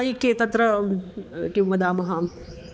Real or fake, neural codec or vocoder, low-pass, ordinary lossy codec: fake; codec, 16 kHz, 4 kbps, X-Codec, HuBERT features, trained on balanced general audio; none; none